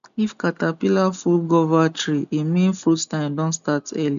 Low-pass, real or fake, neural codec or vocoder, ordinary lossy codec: 7.2 kHz; real; none; none